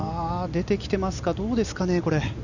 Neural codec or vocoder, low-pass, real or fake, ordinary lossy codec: none; 7.2 kHz; real; none